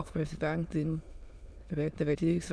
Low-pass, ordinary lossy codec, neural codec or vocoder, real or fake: none; none; autoencoder, 22.05 kHz, a latent of 192 numbers a frame, VITS, trained on many speakers; fake